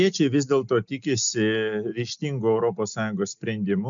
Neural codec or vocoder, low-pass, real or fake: none; 7.2 kHz; real